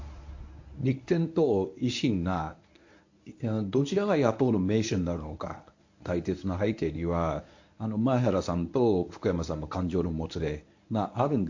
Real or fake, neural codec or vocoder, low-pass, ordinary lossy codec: fake; codec, 24 kHz, 0.9 kbps, WavTokenizer, medium speech release version 1; 7.2 kHz; AAC, 48 kbps